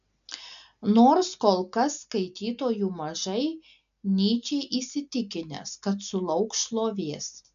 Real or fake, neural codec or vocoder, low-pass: real; none; 7.2 kHz